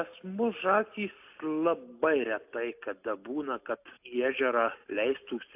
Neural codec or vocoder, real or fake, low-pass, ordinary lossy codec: none; real; 3.6 kHz; AAC, 32 kbps